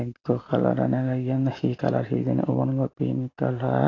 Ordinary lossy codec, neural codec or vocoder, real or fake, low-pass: AAC, 32 kbps; none; real; 7.2 kHz